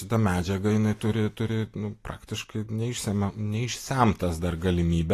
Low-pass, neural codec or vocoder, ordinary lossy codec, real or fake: 14.4 kHz; none; AAC, 48 kbps; real